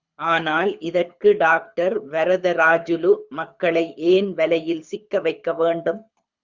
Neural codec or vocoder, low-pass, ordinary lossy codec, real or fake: codec, 24 kHz, 6 kbps, HILCodec; 7.2 kHz; Opus, 64 kbps; fake